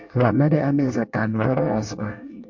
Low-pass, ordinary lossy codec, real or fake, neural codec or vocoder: 7.2 kHz; MP3, 48 kbps; fake; codec, 24 kHz, 1 kbps, SNAC